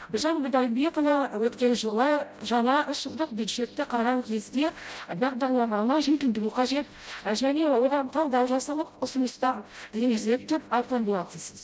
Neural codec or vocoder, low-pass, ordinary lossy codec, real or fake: codec, 16 kHz, 0.5 kbps, FreqCodec, smaller model; none; none; fake